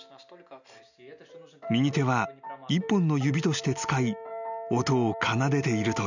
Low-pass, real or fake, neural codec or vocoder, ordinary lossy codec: 7.2 kHz; real; none; none